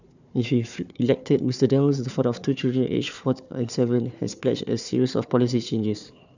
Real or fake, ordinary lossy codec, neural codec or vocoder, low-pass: fake; none; codec, 16 kHz, 4 kbps, FunCodec, trained on Chinese and English, 50 frames a second; 7.2 kHz